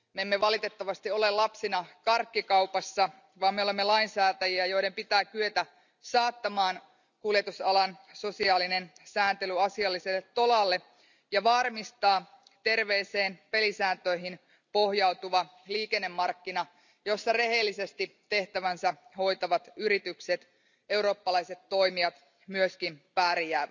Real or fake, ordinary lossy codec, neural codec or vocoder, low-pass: real; none; none; 7.2 kHz